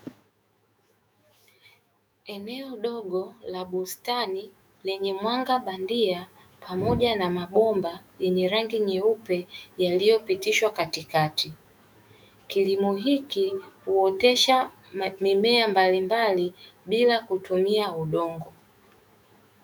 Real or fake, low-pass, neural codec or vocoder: fake; 19.8 kHz; autoencoder, 48 kHz, 128 numbers a frame, DAC-VAE, trained on Japanese speech